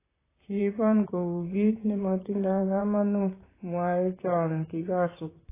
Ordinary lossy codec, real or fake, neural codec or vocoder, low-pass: AAC, 16 kbps; fake; vocoder, 44.1 kHz, 128 mel bands, Pupu-Vocoder; 3.6 kHz